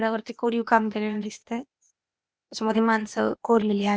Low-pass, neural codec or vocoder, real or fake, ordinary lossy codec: none; codec, 16 kHz, 0.8 kbps, ZipCodec; fake; none